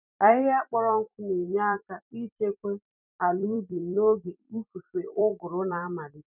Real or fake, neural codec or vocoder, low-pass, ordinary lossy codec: fake; vocoder, 44.1 kHz, 128 mel bands every 256 samples, BigVGAN v2; 3.6 kHz; none